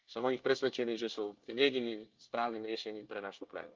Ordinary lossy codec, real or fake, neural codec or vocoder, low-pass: Opus, 32 kbps; fake; codec, 24 kHz, 1 kbps, SNAC; 7.2 kHz